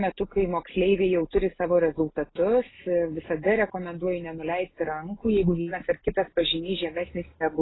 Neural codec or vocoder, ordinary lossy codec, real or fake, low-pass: none; AAC, 16 kbps; real; 7.2 kHz